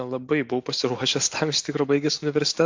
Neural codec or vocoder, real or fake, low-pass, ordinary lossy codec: none; real; 7.2 kHz; AAC, 48 kbps